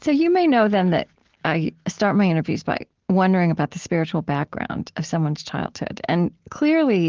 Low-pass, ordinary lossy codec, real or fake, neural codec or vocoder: 7.2 kHz; Opus, 16 kbps; real; none